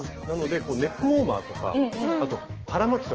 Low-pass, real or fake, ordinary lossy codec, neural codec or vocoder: 7.2 kHz; fake; Opus, 16 kbps; autoencoder, 48 kHz, 128 numbers a frame, DAC-VAE, trained on Japanese speech